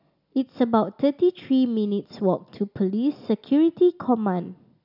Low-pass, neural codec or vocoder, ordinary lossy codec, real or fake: 5.4 kHz; none; none; real